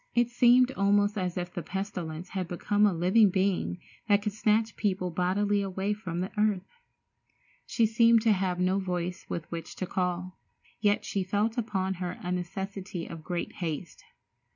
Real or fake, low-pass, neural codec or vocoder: real; 7.2 kHz; none